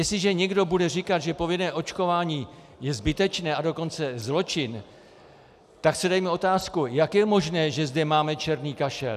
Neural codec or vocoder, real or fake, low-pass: none; real; 14.4 kHz